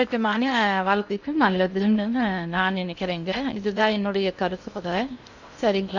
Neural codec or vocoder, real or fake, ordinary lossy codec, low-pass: codec, 16 kHz in and 24 kHz out, 0.6 kbps, FocalCodec, streaming, 2048 codes; fake; none; 7.2 kHz